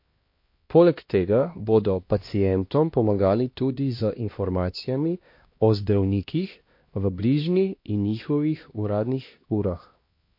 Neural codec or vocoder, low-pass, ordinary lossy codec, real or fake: codec, 16 kHz, 1 kbps, X-Codec, HuBERT features, trained on LibriSpeech; 5.4 kHz; MP3, 32 kbps; fake